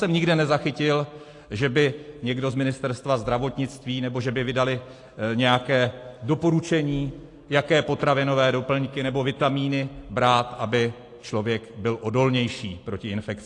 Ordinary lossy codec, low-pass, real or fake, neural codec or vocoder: AAC, 48 kbps; 10.8 kHz; real; none